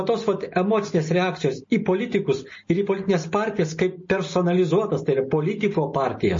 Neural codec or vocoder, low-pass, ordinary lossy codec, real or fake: none; 7.2 kHz; MP3, 32 kbps; real